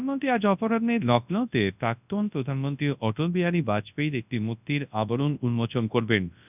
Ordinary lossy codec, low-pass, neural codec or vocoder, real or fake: none; 3.6 kHz; codec, 24 kHz, 0.9 kbps, WavTokenizer, large speech release; fake